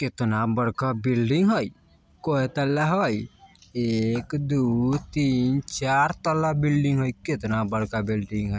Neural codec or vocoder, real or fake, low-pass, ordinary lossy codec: none; real; none; none